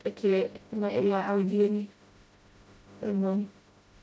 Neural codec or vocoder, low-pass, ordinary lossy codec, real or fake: codec, 16 kHz, 0.5 kbps, FreqCodec, smaller model; none; none; fake